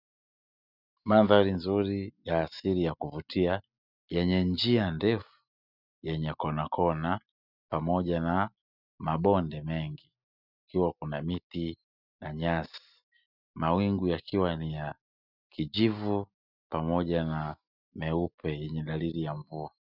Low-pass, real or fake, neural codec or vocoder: 5.4 kHz; real; none